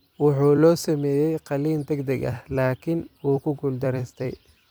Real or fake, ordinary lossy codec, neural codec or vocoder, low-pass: fake; none; vocoder, 44.1 kHz, 128 mel bands every 256 samples, BigVGAN v2; none